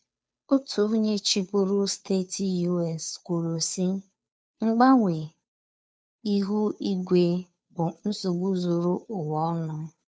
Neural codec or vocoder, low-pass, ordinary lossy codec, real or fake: codec, 16 kHz, 2 kbps, FunCodec, trained on Chinese and English, 25 frames a second; none; none; fake